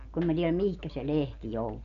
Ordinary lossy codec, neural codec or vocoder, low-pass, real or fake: none; none; 7.2 kHz; real